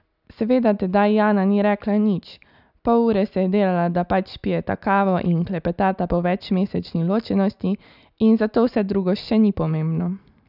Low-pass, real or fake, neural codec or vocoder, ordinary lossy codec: 5.4 kHz; real; none; none